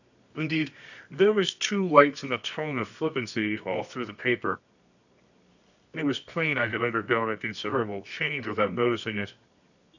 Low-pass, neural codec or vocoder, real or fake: 7.2 kHz; codec, 24 kHz, 0.9 kbps, WavTokenizer, medium music audio release; fake